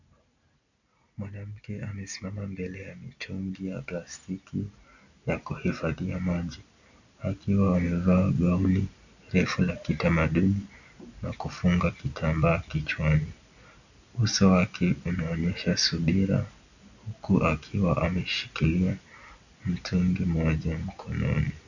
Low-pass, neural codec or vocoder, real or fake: 7.2 kHz; none; real